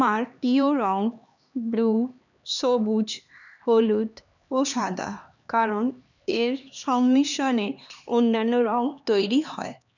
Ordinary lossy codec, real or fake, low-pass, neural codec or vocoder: none; fake; 7.2 kHz; codec, 16 kHz, 2 kbps, X-Codec, HuBERT features, trained on LibriSpeech